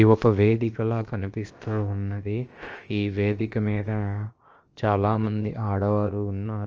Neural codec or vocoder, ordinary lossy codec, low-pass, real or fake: codec, 16 kHz, about 1 kbps, DyCAST, with the encoder's durations; Opus, 24 kbps; 7.2 kHz; fake